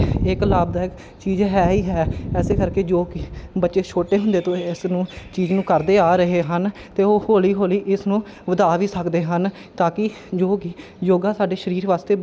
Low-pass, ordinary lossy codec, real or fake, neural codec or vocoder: none; none; real; none